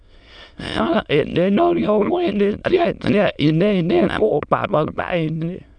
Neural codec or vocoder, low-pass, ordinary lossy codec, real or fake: autoencoder, 22.05 kHz, a latent of 192 numbers a frame, VITS, trained on many speakers; 9.9 kHz; none; fake